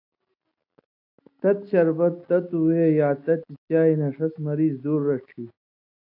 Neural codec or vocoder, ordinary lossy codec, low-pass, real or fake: none; AAC, 32 kbps; 5.4 kHz; real